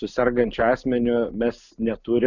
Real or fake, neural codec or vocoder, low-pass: real; none; 7.2 kHz